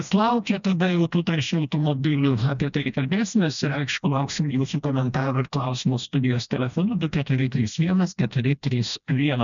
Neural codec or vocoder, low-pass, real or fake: codec, 16 kHz, 1 kbps, FreqCodec, smaller model; 7.2 kHz; fake